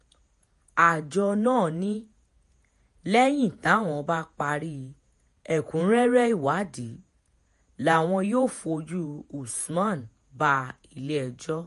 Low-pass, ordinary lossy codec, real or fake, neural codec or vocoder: 14.4 kHz; MP3, 48 kbps; fake; vocoder, 48 kHz, 128 mel bands, Vocos